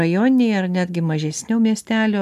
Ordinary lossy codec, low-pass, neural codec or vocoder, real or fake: MP3, 96 kbps; 14.4 kHz; none; real